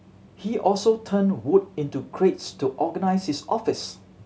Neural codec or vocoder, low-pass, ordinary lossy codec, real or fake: none; none; none; real